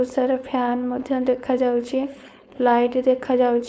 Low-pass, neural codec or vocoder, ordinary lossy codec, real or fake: none; codec, 16 kHz, 4.8 kbps, FACodec; none; fake